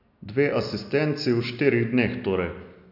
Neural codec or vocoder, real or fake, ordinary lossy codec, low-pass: none; real; none; 5.4 kHz